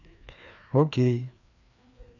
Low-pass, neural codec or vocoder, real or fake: 7.2 kHz; codec, 16 kHz, 2 kbps, FreqCodec, larger model; fake